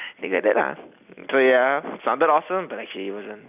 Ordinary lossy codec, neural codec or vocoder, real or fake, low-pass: none; none; real; 3.6 kHz